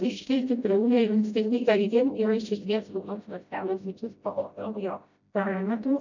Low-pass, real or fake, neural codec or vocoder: 7.2 kHz; fake; codec, 16 kHz, 0.5 kbps, FreqCodec, smaller model